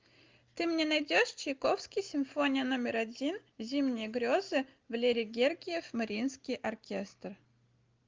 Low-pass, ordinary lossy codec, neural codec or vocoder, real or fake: 7.2 kHz; Opus, 32 kbps; none; real